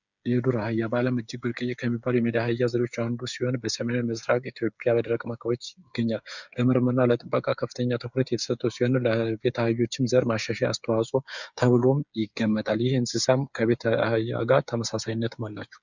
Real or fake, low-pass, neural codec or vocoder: fake; 7.2 kHz; codec, 16 kHz, 8 kbps, FreqCodec, smaller model